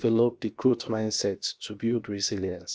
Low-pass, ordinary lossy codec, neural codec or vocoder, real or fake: none; none; codec, 16 kHz, 0.7 kbps, FocalCodec; fake